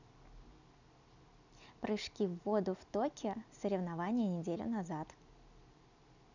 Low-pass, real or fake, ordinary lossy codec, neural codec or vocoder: 7.2 kHz; real; none; none